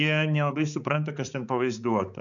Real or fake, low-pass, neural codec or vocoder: fake; 7.2 kHz; codec, 16 kHz, 4 kbps, X-Codec, HuBERT features, trained on balanced general audio